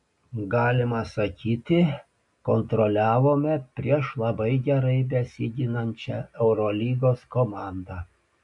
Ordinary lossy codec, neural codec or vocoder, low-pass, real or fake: MP3, 96 kbps; none; 10.8 kHz; real